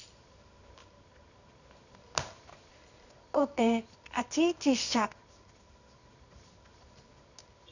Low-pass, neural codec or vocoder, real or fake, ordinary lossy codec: 7.2 kHz; codec, 24 kHz, 0.9 kbps, WavTokenizer, medium music audio release; fake; none